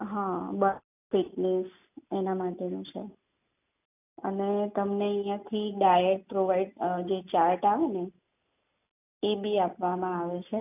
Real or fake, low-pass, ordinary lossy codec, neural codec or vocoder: real; 3.6 kHz; none; none